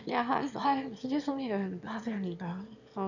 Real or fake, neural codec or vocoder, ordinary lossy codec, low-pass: fake; autoencoder, 22.05 kHz, a latent of 192 numbers a frame, VITS, trained on one speaker; none; 7.2 kHz